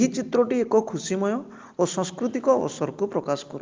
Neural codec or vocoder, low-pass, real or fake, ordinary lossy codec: none; 7.2 kHz; real; Opus, 32 kbps